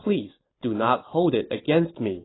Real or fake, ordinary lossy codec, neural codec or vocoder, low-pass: real; AAC, 16 kbps; none; 7.2 kHz